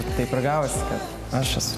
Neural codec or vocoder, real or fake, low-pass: none; real; 14.4 kHz